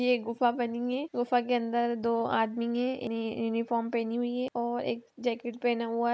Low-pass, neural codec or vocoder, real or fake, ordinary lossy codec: none; none; real; none